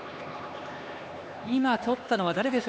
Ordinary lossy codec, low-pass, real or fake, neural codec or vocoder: none; none; fake; codec, 16 kHz, 2 kbps, X-Codec, HuBERT features, trained on LibriSpeech